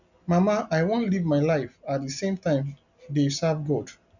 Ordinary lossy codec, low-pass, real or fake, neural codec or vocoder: none; 7.2 kHz; real; none